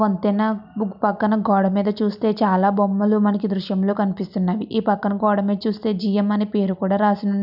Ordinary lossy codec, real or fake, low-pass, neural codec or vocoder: none; real; 5.4 kHz; none